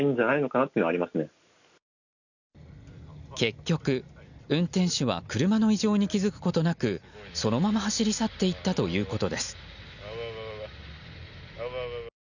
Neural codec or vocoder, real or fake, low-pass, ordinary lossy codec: none; real; 7.2 kHz; none